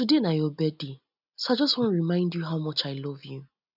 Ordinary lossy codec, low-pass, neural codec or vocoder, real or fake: none; 5.4 kHz; none; real